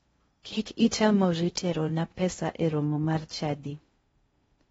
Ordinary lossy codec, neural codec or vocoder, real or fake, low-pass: AAC, 24 kbps; codec, 16 kHz in and 24 kHz out, 0.6 kbps, FocalCodec, streaming, 4096 codes; fake; 10.8 kHz